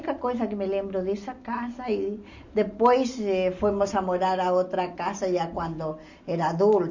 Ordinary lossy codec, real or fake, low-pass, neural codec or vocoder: MP3, 64 kbps; real; 7.2 kHz; none